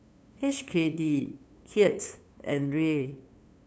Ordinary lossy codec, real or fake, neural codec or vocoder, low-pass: none; fake; codec, 16 kHz, 2 kbps, FunCodec, trained on LibriTTS, 25 frames a second; none